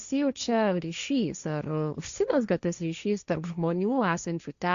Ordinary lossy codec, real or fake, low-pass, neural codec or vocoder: Opus, 64 kbps; fake; 7.2 kHz; codec, 16 kHz, 1.1 kbps, Voila-Tokenizer